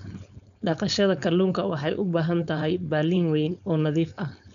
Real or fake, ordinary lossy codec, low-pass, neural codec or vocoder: fake; none; 7.2 kHz; codec, 16 kHz, 4.8 kbps, FACodec